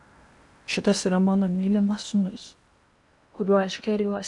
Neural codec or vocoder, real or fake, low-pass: codec, 16 kHz in and 24 kHz out, 0.8 kbps, FocalCodec, streaming, 65536 codes; fake; 10.8 kHz